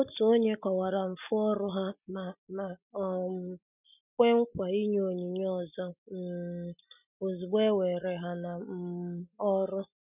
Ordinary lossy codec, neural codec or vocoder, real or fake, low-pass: none; none; real; 3.6 kHz